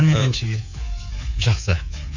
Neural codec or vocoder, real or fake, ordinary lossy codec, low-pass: codec, 24 kHz, 3.1 kbps, DualCodec; fake; none; 7.2 kHz